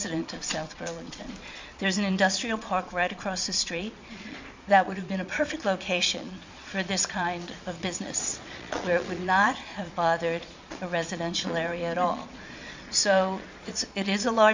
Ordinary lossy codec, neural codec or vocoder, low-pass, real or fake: MP3, 64 kbps; vocoder, 22.05 kHz, 80 mel bands, Vocos; 7.2 kHz; fake